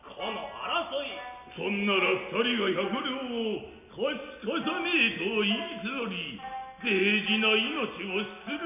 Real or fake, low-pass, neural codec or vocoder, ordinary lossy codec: real; 3.6 kHz; none; none